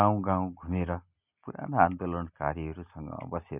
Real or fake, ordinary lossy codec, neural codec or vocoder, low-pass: real; none; none; 3.6 kHz